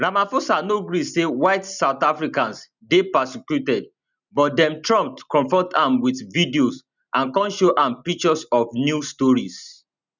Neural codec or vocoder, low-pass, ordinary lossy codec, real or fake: none; 7.2 kHz; none; real